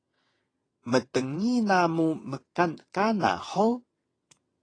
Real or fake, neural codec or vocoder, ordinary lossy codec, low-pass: fake; vocoder, 22.05 kHz, 80 mel bands, WaveNeXt; AAC, 32 kbps; 9.9 kHz